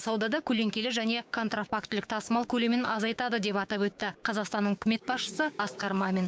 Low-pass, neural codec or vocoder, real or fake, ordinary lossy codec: none; codec, 16 kHz, 6 kbps, DAC; fake; none